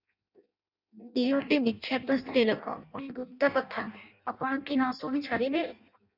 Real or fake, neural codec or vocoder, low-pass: fake; codec, 16 kHz in and 24 kHz out, 0.6 kbps, FireRedTTS-2 codec; 5.4 kHz